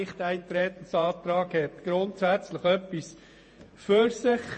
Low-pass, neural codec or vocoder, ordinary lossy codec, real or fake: 10.8 kHz; vocoder, 48 kHz, 128 mel bands, Vocos; MP3, 32 kbps; fake